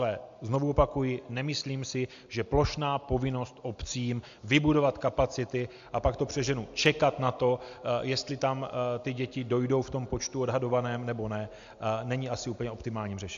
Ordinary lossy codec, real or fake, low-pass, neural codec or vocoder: AAC, 64 kbps; real; 7.2 kHz; none